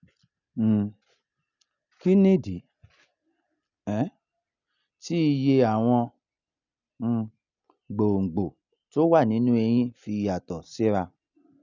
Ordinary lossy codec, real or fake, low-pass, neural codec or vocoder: none; real; 7.2 kHz; none